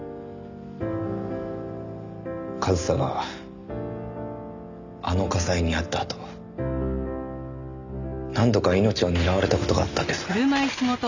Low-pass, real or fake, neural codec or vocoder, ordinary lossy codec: 7.2 kHz; real; none; none